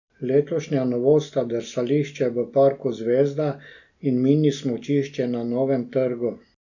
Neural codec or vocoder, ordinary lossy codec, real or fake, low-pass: none; none; real; 7.2 kHz